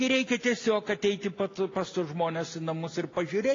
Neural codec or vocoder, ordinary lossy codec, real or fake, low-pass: none; AAC, 32 kbps; real; 7.2 kHz